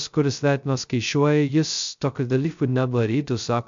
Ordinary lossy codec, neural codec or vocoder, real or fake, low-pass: MP3, 64 kbps; codec, 16 kHz, 0.2 kbps, FocalCodec; fake; 7.2 kHz